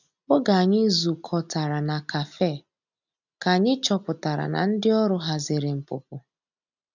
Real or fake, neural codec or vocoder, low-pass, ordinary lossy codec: real; none; 7.2 kHz; none